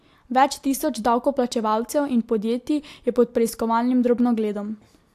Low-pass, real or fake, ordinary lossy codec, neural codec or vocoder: 14.4 kHz; real; AAC, 64 kbps; none